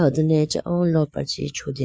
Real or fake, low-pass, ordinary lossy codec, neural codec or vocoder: fake; none; none; codec, 16 kHz, 4 kbps, FreqCodec, larger model